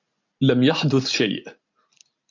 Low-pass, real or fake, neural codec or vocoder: 7.2 kHz; real; none